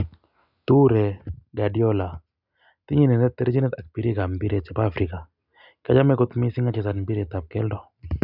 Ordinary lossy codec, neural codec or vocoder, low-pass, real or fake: none; none; 5.4 kHz; real